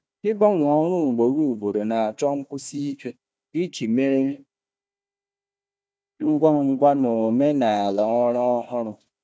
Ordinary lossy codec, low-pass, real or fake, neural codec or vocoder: none; none; fake; codec, 16 kHz, 1 kbps, FunCodec, trained on Chinese and English, 50 frames a second